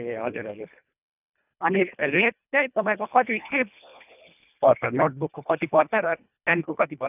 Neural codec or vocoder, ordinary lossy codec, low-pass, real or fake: codec, 24 kHz, 1.5 kbps, HILCodec; none; 3.6 kHz; fake